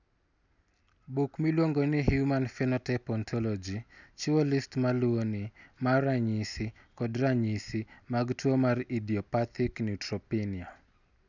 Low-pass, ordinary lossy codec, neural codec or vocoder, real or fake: 7.2 kHz; none; none; real